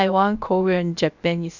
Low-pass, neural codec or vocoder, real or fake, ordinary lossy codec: 7.2 kHz; codec, 16 kHz, about 1 kbps, DyCAST, with the encoder's durations; fake; none